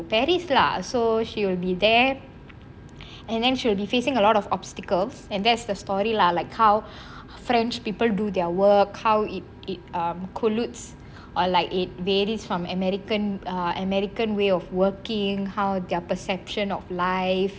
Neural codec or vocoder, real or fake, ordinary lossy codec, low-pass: none; real; none; none